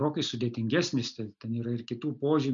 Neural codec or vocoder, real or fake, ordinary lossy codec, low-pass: none; real; MP3, 64 kbps; 7.2 kHz